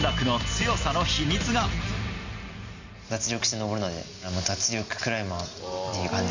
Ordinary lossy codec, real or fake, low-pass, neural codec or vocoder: Opus, 64 kbps; real; 7.2 kHz; none